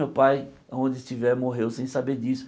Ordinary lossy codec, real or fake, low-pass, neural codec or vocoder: none; real; none; none